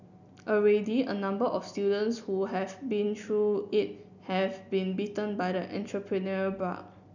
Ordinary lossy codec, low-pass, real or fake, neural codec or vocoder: none; 7.2 kHz; real; none